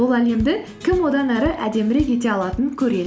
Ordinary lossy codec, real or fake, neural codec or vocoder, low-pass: none; real; none; none